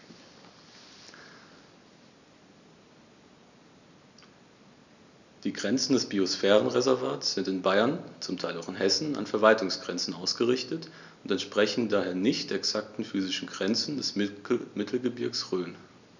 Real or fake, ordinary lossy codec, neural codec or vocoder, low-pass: real; none; none; 7.2 kHz